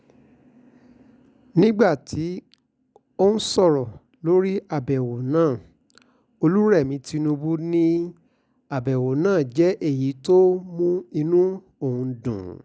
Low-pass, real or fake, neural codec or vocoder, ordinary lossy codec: none; real; none; none